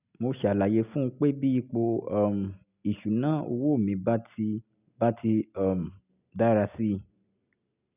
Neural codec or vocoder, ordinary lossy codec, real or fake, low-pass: none; none; real; 3.6 kHz